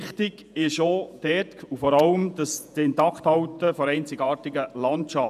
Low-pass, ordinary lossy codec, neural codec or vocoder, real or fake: 14.4 kHz; none; vocoder, 48 kHz, 128 mel bands, Vocos; fake